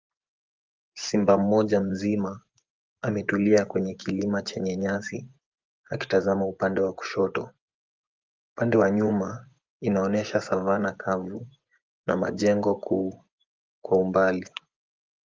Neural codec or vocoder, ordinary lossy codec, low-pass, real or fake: vocoder, 24 kHz, 100 mel bands, Vocos; Opus, 32 kbps; 7.2 kHz; fake